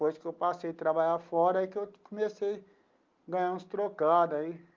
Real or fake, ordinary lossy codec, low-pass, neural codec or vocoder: real; Opus, 32 kbps; 7.2 kHz; none